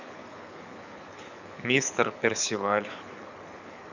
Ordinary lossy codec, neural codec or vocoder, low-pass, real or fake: none; codec, 24 kHz, 6 kbps, HILCodec; 7.2 kHz; fake